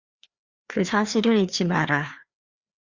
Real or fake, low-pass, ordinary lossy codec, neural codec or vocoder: fake; 7.2 kHz; Opus, 64 kbps; codec, 16 kHz, 2 kbps, FreqCodec, larger model